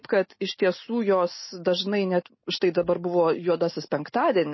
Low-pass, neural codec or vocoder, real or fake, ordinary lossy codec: 7.2 kHz; none; real; MP3, 24 kbps